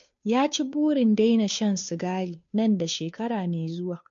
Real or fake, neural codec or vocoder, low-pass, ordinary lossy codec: fake; codec, 16 kHz, 2 kbps, FunCodec, trained on Chinese and English, 25 frames a second; 7.2 kHz; MP3, 48 kbps